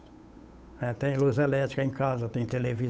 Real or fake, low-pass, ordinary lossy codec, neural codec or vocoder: fake; none; none; codec, 16 kHz, 8 kbps, FunCodec, trained on Chinese and English, 25 frames a second